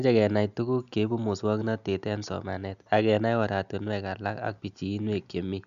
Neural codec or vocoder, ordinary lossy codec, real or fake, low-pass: none; none; real; 7.2 kHz